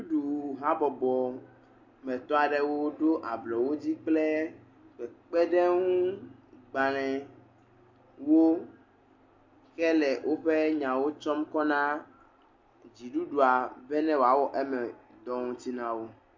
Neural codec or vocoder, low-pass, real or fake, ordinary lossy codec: none; 7.2 kHz; real; MP3, 48 kbps